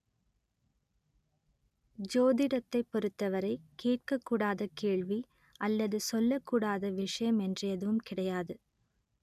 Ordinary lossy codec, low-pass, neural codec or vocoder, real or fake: none; 14.4 kHz; none; real